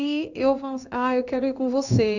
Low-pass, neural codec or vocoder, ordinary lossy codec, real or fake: 7.2 kHz; codec, 16 kHz in and 24 kHz out, 1 kbps, XY-Tokenizer; none; fake